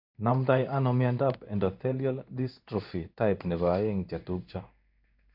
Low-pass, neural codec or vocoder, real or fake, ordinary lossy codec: 5.4 kHz; codec, 16 kHz in and 24 kHz out, 1 kbps, XY-Tokenizer; fake; none